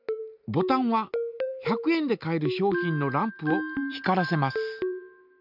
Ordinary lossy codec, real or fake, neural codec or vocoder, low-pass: none; real; none; 5.4 kHz